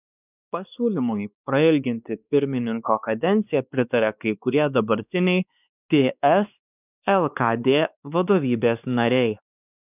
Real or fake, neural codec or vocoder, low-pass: fake; codec, 16 kHz, 2 kbps, X-Codec, WavLM features, trained on Multilingual LibriSpeech; 3.6 kHz